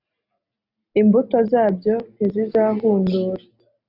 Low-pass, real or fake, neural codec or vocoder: 5.4 kHz; real; none